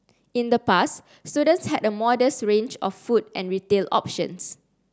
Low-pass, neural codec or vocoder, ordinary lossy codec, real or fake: none; none; none; real